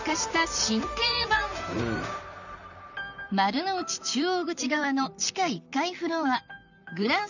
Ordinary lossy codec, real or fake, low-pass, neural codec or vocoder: none; fake; 7.2 kHz; vocoder, 44.1 kHz, 128 mel bands, Pupu-Vocoder